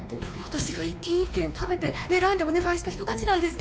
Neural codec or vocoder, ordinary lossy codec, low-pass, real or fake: codec, 16 kHz, 2 kbps, X-Codec, WavLM features, trained on Multilingual LibriSpeech; none; none; fake